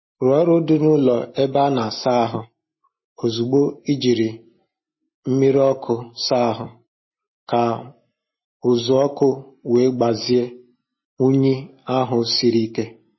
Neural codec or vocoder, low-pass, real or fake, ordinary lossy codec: none; 7.2 kHz; real; MP3, 24 kbps